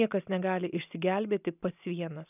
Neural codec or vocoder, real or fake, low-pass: none; real; 3.6 kHz